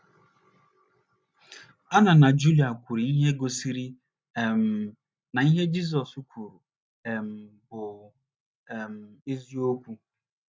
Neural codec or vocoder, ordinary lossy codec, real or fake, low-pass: none; none; real; none